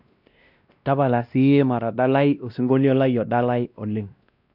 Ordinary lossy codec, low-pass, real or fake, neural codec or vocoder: none; 5.4 kHz; fake; codec, 16 kHz, 1 kbps, X-Codec, WavLM features, trained on Multilingual LibriSpeech